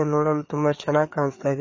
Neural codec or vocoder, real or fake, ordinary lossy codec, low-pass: codec, 16 kHz, 4 kbps, FreqCodec, larger model; fake; MP3, 32 kbps; 7.2 kHz